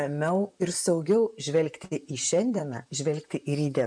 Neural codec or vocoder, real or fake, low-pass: vocoder, 44.1 kHz, 128 mel bands, Pupu-Vocoder; fake; 9.9 kHz